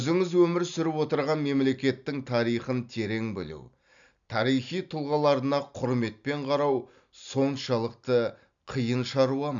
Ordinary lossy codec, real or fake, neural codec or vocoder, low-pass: none; real; none; 7.2 kHz